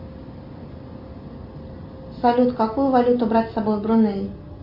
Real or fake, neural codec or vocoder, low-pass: real; none; 5.4 kHz